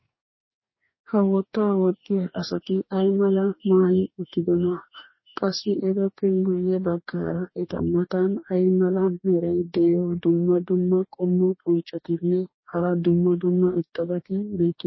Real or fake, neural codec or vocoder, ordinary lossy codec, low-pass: fake; codec, 44.1 kHz, 2.6 kbps, DAC; MP3, 24 kbps; 7.2 kHz